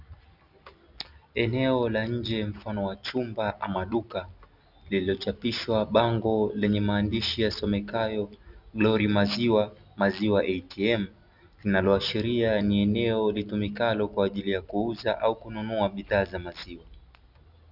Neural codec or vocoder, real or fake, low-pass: none; real; 5.4 kHz